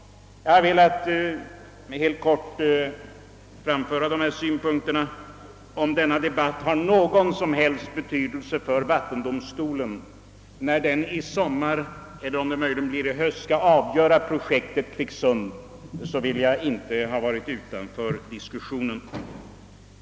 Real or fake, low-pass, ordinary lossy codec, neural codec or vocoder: real; none; none; none